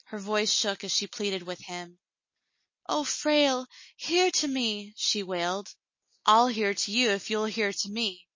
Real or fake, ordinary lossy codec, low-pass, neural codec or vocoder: real; MP3, 32 kbps; 7.2 kHz; none